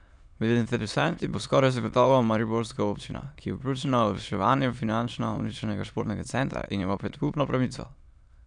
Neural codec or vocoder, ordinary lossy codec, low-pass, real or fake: autoencoder, 22.05 kHz, a latent of 192 numbers a frame, VITS, trained on many speakers; none; 9.9 kHz; fake